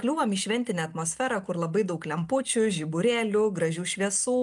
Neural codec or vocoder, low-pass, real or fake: none; 10.8 kHz; real